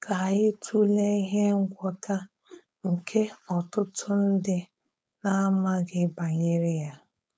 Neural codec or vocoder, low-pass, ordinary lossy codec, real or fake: codec, 16 kHz, 4.8 kbps, FACodec; none; none; fake